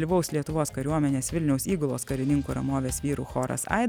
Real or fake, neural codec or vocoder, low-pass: real; none; 19.8 kHz